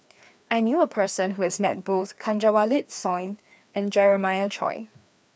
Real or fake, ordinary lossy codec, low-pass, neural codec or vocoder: fake; none; none; codec, 16 kHz, 2 kbps, FreqCodec, larger model